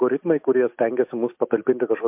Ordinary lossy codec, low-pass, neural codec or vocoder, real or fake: MP3, 32 kbps; 3.6 kHz; none; real